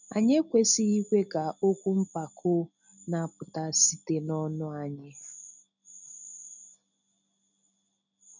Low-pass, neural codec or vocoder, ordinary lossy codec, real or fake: 7.2 kHz; none; none; real